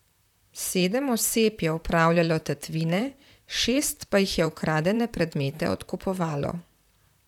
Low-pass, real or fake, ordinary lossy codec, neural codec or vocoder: 19.8 kHz; fake; none; vocoder, 44.1 kHz, 128 mel bands, Pupu-Vocoder